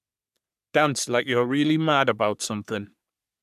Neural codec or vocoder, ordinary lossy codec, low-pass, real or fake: codec, 44.1 kHz, 3.4 kbps, Pupu-Codec; none; 14.4 kHz; fake